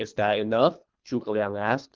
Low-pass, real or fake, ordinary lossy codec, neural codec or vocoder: 7.2 kHz; fake; Opus, 32 kbps; codec, 24 kHz, 3 kbps, HILCodec